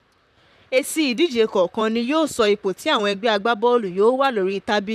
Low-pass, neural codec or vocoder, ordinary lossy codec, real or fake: 14.4 kHz; vocoder, 44.1 kHz, 128 mel bands, Pupu-Vocoder; none; fake